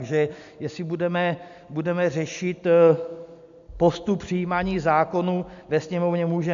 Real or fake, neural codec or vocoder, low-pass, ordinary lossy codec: real; none; 7.2 kHz; AAC, 64 kbps